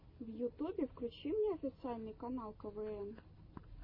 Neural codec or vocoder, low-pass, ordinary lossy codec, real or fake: none; 5.4 kHz; MP3, 24 kbps; real